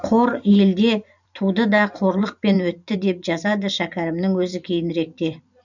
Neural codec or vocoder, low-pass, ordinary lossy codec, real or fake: vocoder, 44.1 kHz, 128 mel bands every 512 samples, BigVGAN v2; 7.2 kHz; none; fake